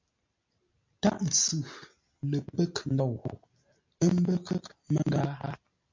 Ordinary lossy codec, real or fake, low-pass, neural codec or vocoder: MP3, 48 kbps; real; 7.2 kHz; none